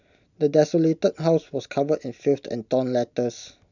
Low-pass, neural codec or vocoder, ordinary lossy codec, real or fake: 7.2 kHz; none; none; real